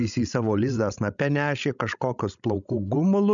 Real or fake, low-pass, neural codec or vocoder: fake; 7.2 kHz; codec, 16 kHz, 16 kbps, FreqCodec, larger model